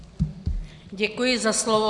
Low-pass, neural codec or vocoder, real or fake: 10.8 kHz; none; real